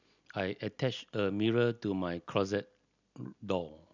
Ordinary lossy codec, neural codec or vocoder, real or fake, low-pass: none; none; real; 7.2 kHz